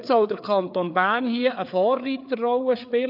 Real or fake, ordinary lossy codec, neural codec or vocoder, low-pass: fake; none; codec, 16 kHz, 4 kbps, FreqCodec, larger model; 5.4 kHz